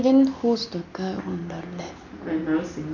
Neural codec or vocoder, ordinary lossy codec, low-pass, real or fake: none; none; 7.2 kHz; real